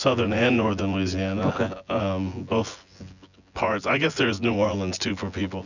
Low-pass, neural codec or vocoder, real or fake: 7.2 kHz; vocoder, 24 kHz, 100 mel bands, Vocos; fake